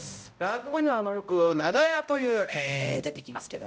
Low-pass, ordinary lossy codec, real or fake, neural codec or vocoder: none; none; fake; codec, 16 kHz, 0.5 kbps, X-Codec, HuBERT features, trained on balanced general audio